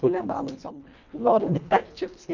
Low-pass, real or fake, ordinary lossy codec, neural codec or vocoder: 7.2 kHz; fake; none; codec, 24 kHz, 1.5 kbps, HILCodec